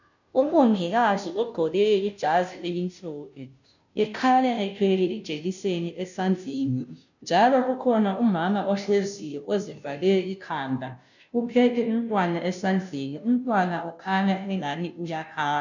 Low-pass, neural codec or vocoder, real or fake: 7.2 kHz; codec, 16 kHz, 0.5 kbps, FunCodec, trained on Chinese and English, 25 frames a second; fake